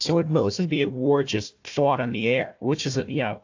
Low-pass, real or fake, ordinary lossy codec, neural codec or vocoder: 7.2 kHz; fake; AAC, 48 kbps; codec, 16 kHz, 1 kbps, FunCodec, trained on Chinese and English, 50 frames a second